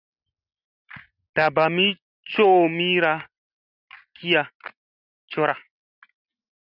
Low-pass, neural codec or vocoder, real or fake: 5.4 kHz; none; real